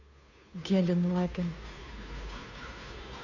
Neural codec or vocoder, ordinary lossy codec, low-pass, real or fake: codec, 16 kHz, 2 kbps, FunCodec, trained on Chinese and English, 25 frames a second; none; 7.2 kHz; fake